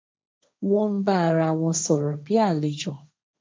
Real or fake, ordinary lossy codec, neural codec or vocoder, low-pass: fake; none; codec, 16 kHz, 1.1 kbps, Voila-Tokenizer; none